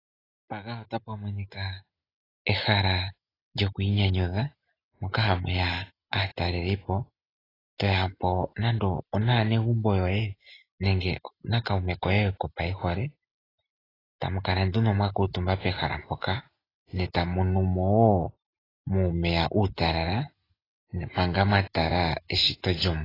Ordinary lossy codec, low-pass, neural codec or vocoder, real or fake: AAC, 24 kbps; 5.4 kHz; none; real